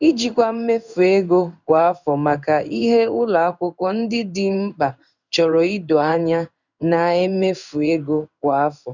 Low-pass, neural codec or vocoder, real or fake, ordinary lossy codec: 7.2 kHz; codec, 16 kHz in and 24 kHz out, 1 kbps, XY-Tokenizer; fake; none